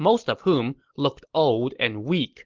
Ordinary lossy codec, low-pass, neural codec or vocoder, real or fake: Opus, 16 kbps; 7.2 kHz; none; real